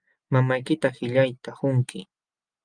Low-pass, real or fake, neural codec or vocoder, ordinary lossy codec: 9.9 kHz; real; none; Opus, 32 kbps